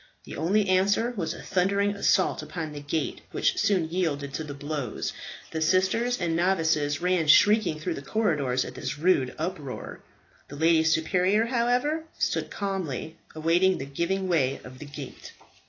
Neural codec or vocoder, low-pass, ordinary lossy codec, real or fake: none; 7.2 kHz; AAC, 32 kbps; real